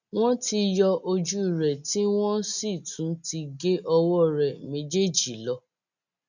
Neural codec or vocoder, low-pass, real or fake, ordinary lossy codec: none; 7.2 kHz; real; none